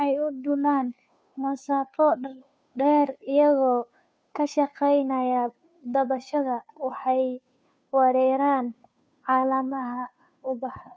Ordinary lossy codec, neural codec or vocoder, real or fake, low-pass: none; codec, 16 kHz, 2 kbps, FunCodec, trained on Chinese and English, 25 frames a second; fake; none